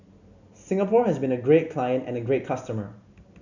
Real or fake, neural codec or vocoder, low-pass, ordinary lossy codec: real; none; 7.2 kHz; none